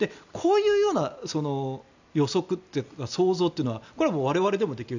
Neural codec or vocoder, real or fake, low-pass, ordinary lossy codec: none; real; 7.2 kHz; none